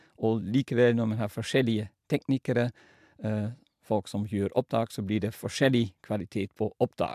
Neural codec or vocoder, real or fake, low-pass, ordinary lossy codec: none; real; 14.4 kHz; AAC, 96 kbps